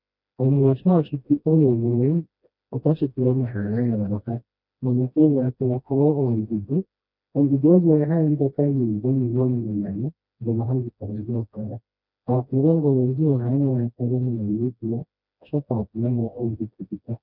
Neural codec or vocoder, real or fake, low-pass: codec, 16 kHz, 1 kbps, FreqCodec, smaller model; fake; 5.4 kHz